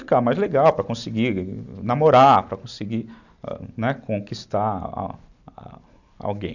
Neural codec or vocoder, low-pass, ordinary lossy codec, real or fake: none; 7.2 kHz; none; real